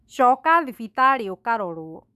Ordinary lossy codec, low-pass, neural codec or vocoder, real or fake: none; 14.4 kHz; autoencoder, 48 kHz, 128 numbers a frame, DAC-VAE, trained on Japanese speech; fake